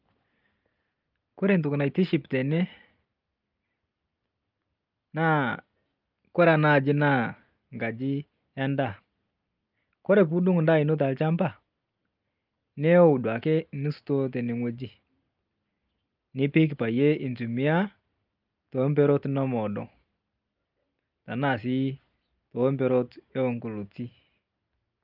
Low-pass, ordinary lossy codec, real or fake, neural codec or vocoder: 5.4 kHz; Opus, 24 kbps; real; none